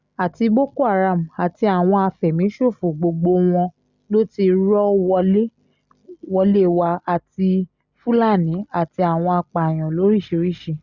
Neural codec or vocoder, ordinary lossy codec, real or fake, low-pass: none; Opus, 64 kbps; real; 7.2 kHz